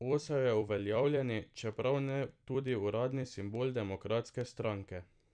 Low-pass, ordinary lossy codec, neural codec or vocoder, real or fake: 9.9 kHz; none; vocoder, 44.1 kHz, 128 mel bands every 256 samples, BigVGAN v2; fake